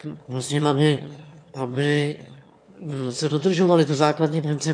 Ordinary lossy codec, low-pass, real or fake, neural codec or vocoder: AAC, 64 kbps; 9.9 kHz; fake; autoencoder, 22.05 kHz, a latent of 192 numbers a frame, VITS, trained on one speaker